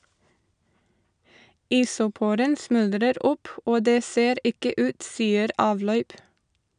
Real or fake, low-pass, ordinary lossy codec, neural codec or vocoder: real; 9.9 kHz; none; none